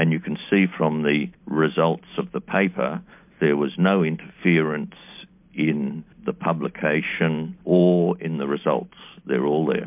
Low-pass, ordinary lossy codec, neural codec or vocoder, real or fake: 3.6 kHz; MP3, 32 kbps; none; real